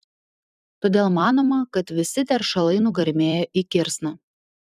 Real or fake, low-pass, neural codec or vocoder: fake; 14.4 kHz; vocoder, 44.1 kHz, 128 mel bands every 256 samples, BigVGAN v2